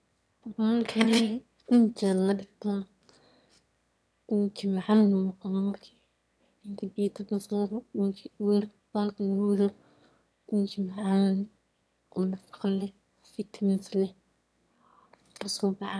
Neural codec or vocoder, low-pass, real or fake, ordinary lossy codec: autoencoder, 22.05 kHz, a latent of 192 numbers a frame, VITS, trained on one speaker; none; fake; none